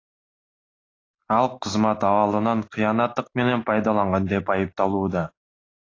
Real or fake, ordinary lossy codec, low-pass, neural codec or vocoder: real; AAC, 32 kbps; 7.2 kHz; none